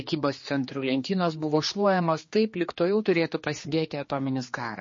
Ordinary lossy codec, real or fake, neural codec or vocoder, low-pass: MP3, 32 kbps; fake; codec, 16 kHz, 2 kbps, X-Codec, HuBERT features, trained on general audio; 7.2 kHz